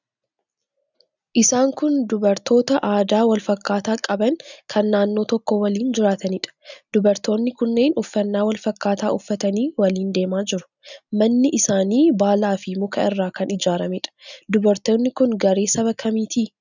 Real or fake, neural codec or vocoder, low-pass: real; none; 7.2 kHz